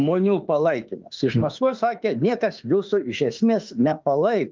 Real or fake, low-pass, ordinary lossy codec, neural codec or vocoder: fake; 7.2 kHz; Opus, 24 kbps; autoencoder, 48 kHz, 32 numbers a frame, DAC-VAE, trained on Japanese speech